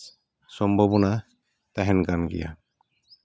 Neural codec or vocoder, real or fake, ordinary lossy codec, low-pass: none; real; none; none